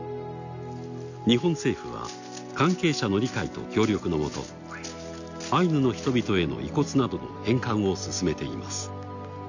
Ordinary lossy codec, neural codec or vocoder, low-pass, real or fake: none; none; 7.2 kHz; real